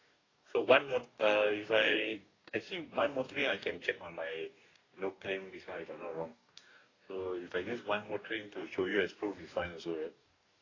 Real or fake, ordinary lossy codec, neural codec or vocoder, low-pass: fake; AAC, 32 kbps; codec, 44.1 kHz, 2.6 kbps, DAC; 7.2 kHz